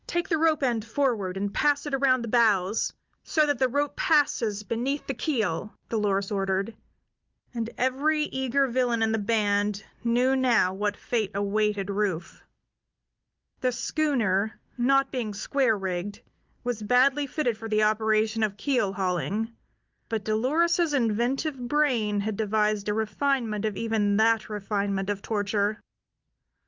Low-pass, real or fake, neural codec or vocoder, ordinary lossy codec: 7.2 kHz; real; none; Opus, 24 kbps